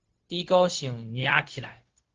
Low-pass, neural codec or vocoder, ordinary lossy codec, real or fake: 7.2 kHz; codec, 16 kHz, 0.4 kbps, LongCat-Audio-Codec; Opus, 16 kbps; fake